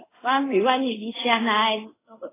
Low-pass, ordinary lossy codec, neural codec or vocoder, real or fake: 3.6 kHz; AAC, 16 kbps; codec, 24 kHz, 0.5 kbps, DualCodec; fake